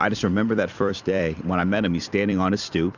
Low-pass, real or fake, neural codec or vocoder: 7.2 kHz; fake; vocoder, 44.1 kHz, 128 mel bands every 256 samples, BigVGAN v2